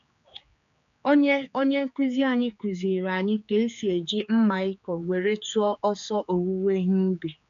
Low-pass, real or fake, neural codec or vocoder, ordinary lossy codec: 7.2 kHz; fake; codec, 16 kHz, 4 kbps, X-Codec, HuBERT features, trained on general audio; none